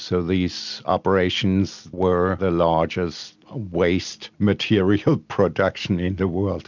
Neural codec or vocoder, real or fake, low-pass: none; real; 7.2 kHz